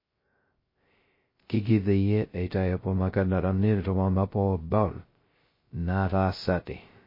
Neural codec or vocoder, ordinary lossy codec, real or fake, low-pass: codec, 16 kHz, 0.2 kbps, FocalCodec; MP3, 24 kbps; fake; 5.4 kHz